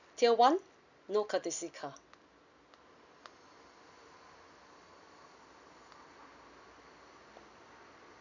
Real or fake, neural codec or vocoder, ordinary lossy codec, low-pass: real; none; none; 7.2 kHz